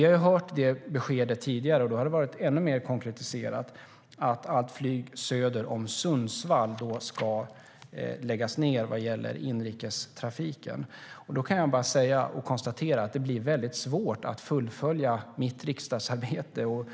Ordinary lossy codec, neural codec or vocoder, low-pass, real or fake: none; none; none; real